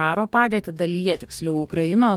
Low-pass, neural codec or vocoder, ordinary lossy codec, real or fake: 19.8 kHz; codec, 44.1 kHz, 2.6 kbps, DAC; MP3, 96 kbps; fake